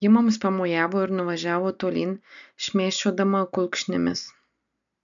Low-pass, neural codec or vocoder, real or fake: 7.2 kHz; none; real